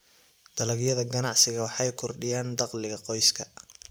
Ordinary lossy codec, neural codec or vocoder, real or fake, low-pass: none; vocoder, 44.1 kHz, 128 mel bands every 512 samples, BigVGAN v2; fake; none